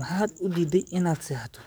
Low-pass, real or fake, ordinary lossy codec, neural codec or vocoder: none; fake; none; codec, 44.1 kHz, 7.8 kbps, DAC